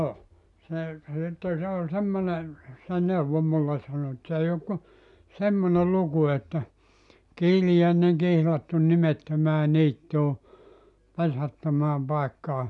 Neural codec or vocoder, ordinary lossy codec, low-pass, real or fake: none; none; 10.8 kHz; real